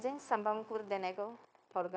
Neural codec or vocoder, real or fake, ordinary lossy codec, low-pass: codec, 16 kHz, 0.9 kbps, LongCat-Audio-Codec; fake; none; none